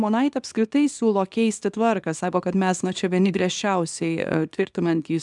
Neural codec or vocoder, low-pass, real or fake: codec, 24 kHz, 0.9 kbps, WavTokenizer, small release; 10.8 kHz; fake